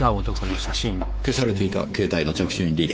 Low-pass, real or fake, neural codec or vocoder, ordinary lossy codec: none; fake; codec, 16 kHz, 4 kbps, X-Codec, WavLM features, trained on Multilingual LibriSpeech; none